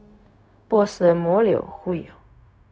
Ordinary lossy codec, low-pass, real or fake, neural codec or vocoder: none; none; fake; codec, 16 kHz, 0.4 kbps, LongCat-Audio-Codec